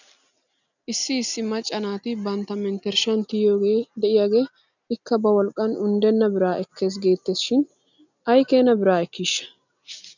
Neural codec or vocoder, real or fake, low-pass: none; real; 7.2 kHz